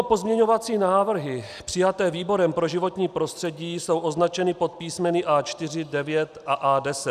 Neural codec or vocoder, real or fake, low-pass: none; real; 14.4 kHz